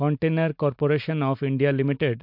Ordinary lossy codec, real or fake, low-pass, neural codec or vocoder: MP3, 48 kbps; real; 5.4 kHz; none